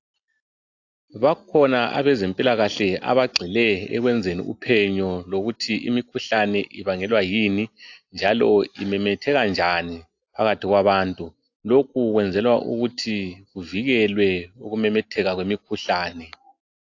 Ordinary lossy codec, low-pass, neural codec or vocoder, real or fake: AAC, 48 kbps; 7.2 kHz; none; real